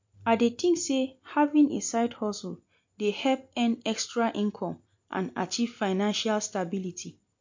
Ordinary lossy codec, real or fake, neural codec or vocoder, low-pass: MP3, 48 kbps; real; none; 7.2 kHz